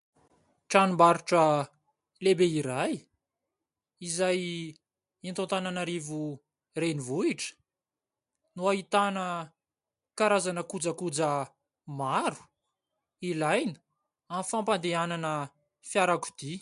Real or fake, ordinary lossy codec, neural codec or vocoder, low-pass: real; MP3, 64 kbps; none; 10.8 kHz